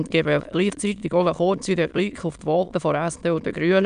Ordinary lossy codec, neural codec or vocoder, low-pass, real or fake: none; autoencoder, 22.05 kHz, a latent of 192 numbers a frame, VITS, trained on many speakers; 9.9 kHz; fake